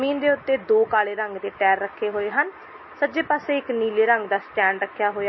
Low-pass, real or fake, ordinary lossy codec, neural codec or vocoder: 7.2 kHz; real; MP3, 24 kbps; none